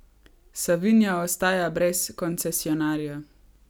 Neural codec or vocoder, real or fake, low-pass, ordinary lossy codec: none; real; none; none